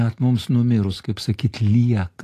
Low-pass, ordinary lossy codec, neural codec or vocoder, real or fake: 14.4 kHz; AAC, 48 kbps; none; real